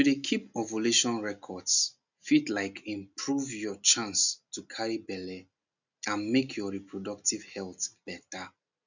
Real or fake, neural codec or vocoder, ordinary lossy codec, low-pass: real; none; none; 7.2 kHz